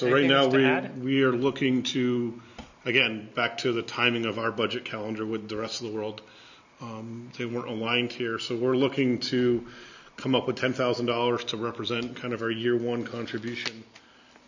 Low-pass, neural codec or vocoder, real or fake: 7.2 kHz; none; real